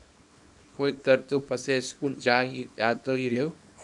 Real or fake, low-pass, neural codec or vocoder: fake; 10.8 kHz; codec, 24 kHz, 0.9 kbps, WavTokenizer, small release